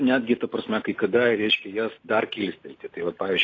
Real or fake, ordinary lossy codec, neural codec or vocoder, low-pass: real; AAC, 32 kbps; none; 7.2 kHz